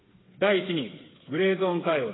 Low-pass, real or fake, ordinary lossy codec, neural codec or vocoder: 7.2 kHz; fake; AAC, 16 kbps; codec, 16 kHz, 4 kbps, FreqCodec, smaller model